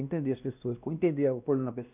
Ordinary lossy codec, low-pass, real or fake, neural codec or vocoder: none; 3.6 kHz; fake; codec, 16 kHz, 1 kbps, X-Codec, WavLM features, trained on Multilingual LibriSpeech